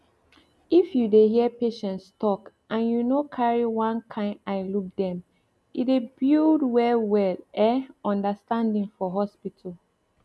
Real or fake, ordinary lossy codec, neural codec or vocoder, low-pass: real; none; none; none